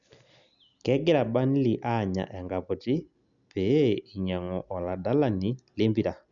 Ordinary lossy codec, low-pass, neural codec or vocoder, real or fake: none; 7.2 kHz; none; real